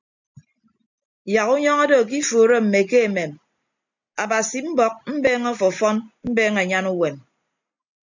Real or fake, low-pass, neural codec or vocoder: real; 7.2 kHz; none